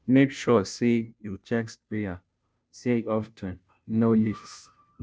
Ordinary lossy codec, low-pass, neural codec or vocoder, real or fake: none; none; codec, 16 kHz, 0.5 kbps, FunCodec, trained on Chinese and English, 25 frames a second; fake